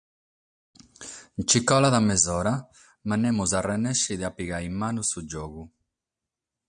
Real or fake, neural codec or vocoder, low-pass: real; none; 9.9 kHz